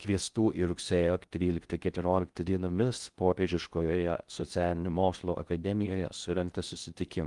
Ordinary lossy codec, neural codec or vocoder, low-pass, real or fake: Opus, 32 kbps; codec, 16 kHz in and 24 kHz out, 0.6 kbps, FocalCodec, streaming, 2048 codes; 10.8 kHz; fake